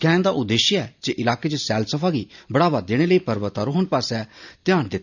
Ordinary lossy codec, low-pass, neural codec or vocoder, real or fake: none; 7.2 kHz; none; real